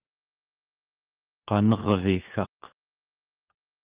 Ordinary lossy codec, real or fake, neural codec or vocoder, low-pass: Opus, 32 kbps; fake; codec, 16 kHz, 4 kbps, FunCodec, trained on LibriTTS, 50 frames a second; 3.6 kHz